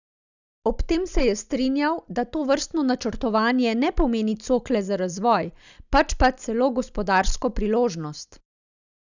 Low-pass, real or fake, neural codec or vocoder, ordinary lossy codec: 7.2 kHz; real; none; none